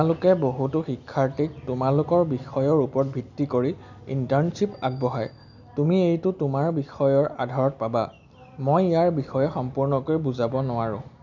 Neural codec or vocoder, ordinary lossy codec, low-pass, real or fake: none; none; 7.2 kHz; real